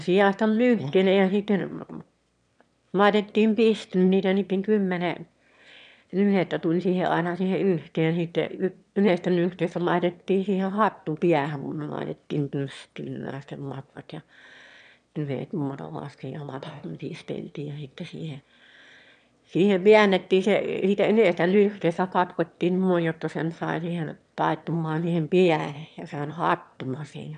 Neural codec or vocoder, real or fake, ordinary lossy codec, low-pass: autoencoder, 22.05 kHz, a latent of 192 numbers a frame, VITS, trained on one speaker; fake; none; 9.9 kHz